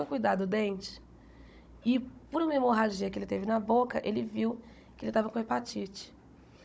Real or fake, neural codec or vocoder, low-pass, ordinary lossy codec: fake; codec, 16 kHz, 16 kbps, FunCodec, trained on Chinese and English, 50 frames a second; none; none